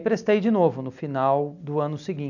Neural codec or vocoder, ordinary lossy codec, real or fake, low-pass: none; none; real; 7.2 kHz